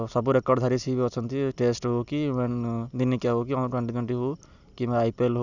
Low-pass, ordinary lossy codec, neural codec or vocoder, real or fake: 7.2 kHz; none; none; real